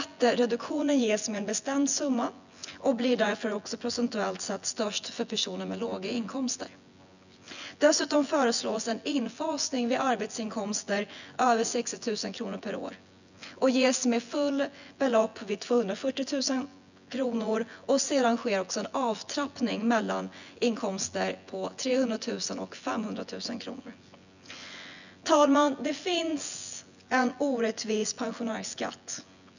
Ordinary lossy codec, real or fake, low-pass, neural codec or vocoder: none; fake; 7.2 kHz; vocoder, 24 kHz, 100 mel bands, Vocos